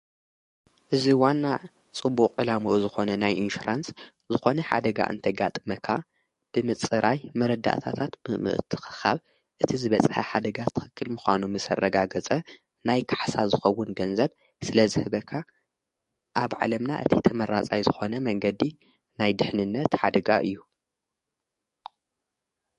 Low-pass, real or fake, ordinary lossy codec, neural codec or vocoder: 14.4 kHz; fake; MP3, 48 kbps; codec, 44.1 kHz, 7.8 kbps, DAC